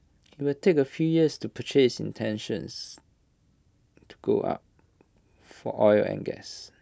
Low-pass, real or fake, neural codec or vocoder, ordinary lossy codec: none; real; none; none